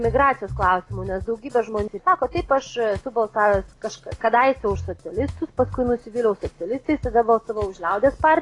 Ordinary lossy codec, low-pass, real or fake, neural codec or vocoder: AAC, 32 kbps; 10.8 kHz; real; none